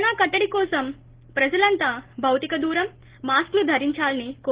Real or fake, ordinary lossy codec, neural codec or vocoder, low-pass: real; Opus, 16 kbps; none; 3.6 kHz